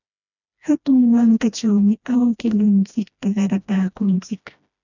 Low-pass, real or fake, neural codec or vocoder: 7.2 kHz; fake; codec, 16 kHz, 1 kbps, FreqCodec, smaller model